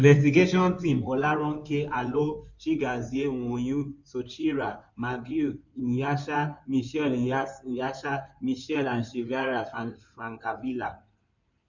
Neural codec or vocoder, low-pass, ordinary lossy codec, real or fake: codec, 16 kHz in and 24 kHz out, 2.2 kbps, FireRedTTS-2 codec; 7.2 kHz; none; fake